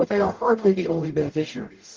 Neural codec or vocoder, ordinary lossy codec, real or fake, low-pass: codec, 44.1 kHz, 0.9 kbps, DAC; Opus, 16 kbps; fake; 7.2 kHz